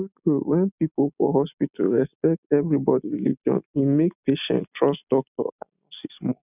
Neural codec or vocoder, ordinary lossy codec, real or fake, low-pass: none; none; real; 3.6 kHz